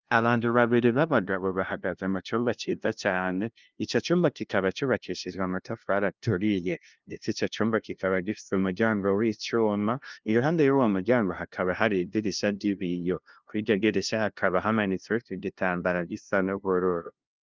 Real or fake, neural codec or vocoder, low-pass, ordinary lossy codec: fake; codec, 16 kHz, 0.5 kbps, FunCodec, trained on LibriTTS, 25 frames a second; 7.2 kHz; Opus, 24 kbps